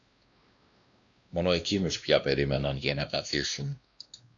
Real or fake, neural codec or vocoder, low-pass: fake; codec, 16 kHz, 1 kbps, X-Codec, WavLM features, trained on Multilingual LibriSpeech; 7.2 kHz